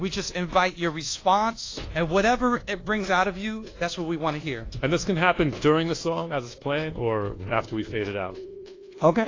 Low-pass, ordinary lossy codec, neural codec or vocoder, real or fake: 7.2 kHz; AAC, 32 kbps; codec, 24 kHz, 1.2 kbps, DualCodec; fake